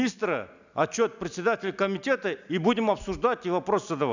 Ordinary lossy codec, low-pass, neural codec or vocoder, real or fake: none; 7.2 kHz; none; real